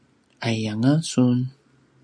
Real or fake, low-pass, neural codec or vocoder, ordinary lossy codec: real; 9.9 kHz; none; MP3, 64 kbps